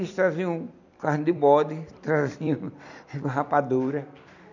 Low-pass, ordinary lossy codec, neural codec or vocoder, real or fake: 7.2 kHz; none; vocoder, 44.1 kHz, 128 mel bands every 256 samples, BigVGAN v2; fake